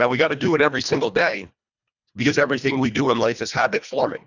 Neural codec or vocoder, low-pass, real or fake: codec, 24 kHz, 1.5 kbps, HILCodec; 7.2 kHz; fake